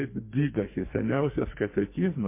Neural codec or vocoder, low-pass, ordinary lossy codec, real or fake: codec, 44.1 kHz, 2.6 kbps, SNAC; 3.6 kHz; MP3, 24 kbps; fake